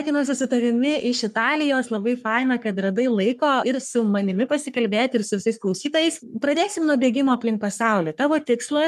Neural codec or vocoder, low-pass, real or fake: codec, 44.1 kHz, 3.4 kbps, Pupu-Codec; 14.4 kHz; fake